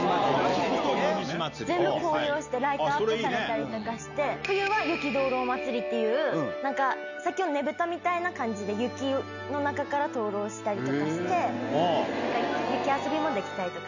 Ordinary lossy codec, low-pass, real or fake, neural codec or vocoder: none; 7.2 kHz; real; none